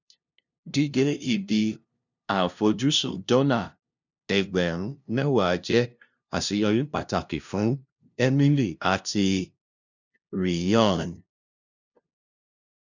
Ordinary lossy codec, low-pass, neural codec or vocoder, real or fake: none; 7.2 kHz; codec, 16 kHz, 0.5 kbps, FunCodec, trained on LibriTTS, 25 frames a second; fake